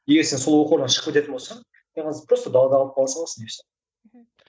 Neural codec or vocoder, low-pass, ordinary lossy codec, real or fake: none; none; none; real